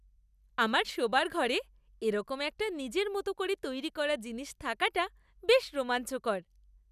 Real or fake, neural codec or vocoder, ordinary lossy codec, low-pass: real; none; none; 14.4 kHz